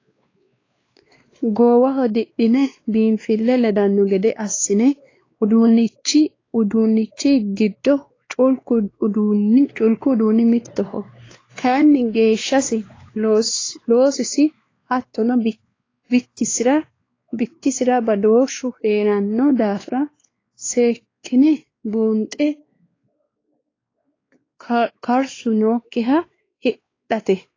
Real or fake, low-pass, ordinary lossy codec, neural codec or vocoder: fake; 7.2 kHz; AAC, 32 kbps; codec, 16 kHz, 2 kbps, X-Codec, WavLM features, trained on Multilingual LibriSpeech